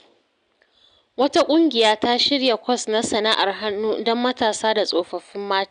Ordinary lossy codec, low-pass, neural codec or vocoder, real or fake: none; 9.9 kHz; none; real